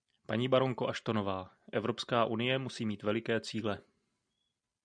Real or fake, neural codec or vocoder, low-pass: real; none; 9.9 kHz